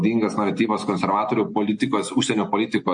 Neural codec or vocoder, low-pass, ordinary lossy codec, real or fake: none; 9.9 kHz; MP3, 48 kbps; real